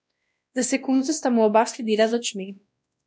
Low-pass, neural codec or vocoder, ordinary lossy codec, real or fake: none; codec, 16 kHz, 1 kbps, X-Codec, WavLM features, trained on Multilingual LibriSpeech; none; fake